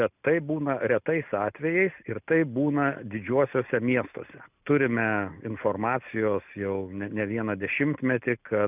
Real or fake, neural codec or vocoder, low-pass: real; none; 3.6 kHz